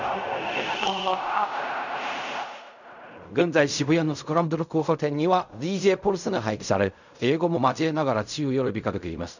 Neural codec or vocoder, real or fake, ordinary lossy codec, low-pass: codec, 16 kHz in and 24 kHz out, 0.4 kbps, LongCat-Audio-Codec, fine tuned four codebook decoder; fake; none; 7.2 kHz